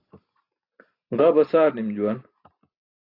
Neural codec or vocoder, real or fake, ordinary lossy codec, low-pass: none; real; AAC, 32 kbps; 5.4 kHz